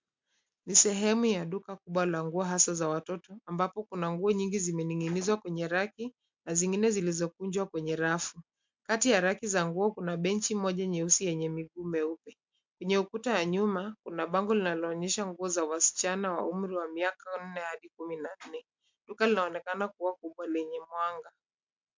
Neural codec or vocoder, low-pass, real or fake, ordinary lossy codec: none; 7.2 kHz; real; MP3, 64 kbps